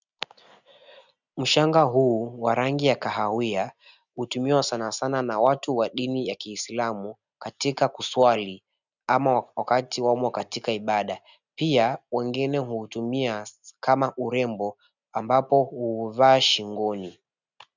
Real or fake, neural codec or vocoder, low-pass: real; none; 7.2 kHz